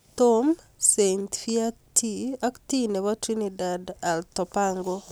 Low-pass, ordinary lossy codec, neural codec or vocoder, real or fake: none; none; none; real